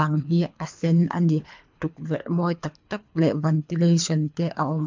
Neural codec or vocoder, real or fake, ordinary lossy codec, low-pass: codec, 24 kHz, 3 kbps, HILCodec; fake; MP3, 64 kbps; 7.2 kHz